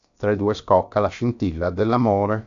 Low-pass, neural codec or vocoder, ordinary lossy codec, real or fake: 7.2 kHz; codec, 16 kHz, about 1 kbps, DyCAST, with the encoder's durations; AAC, 64 kbps; fake